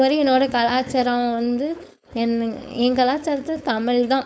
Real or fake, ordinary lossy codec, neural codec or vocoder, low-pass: fake; none; codec, 16 kHz, 4.8 kbps, FACodec; none